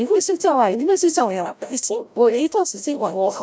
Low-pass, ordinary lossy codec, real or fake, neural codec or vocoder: none; none; fake; codec, 16 kHz, 0.5 kbps, FreqCodec, larger model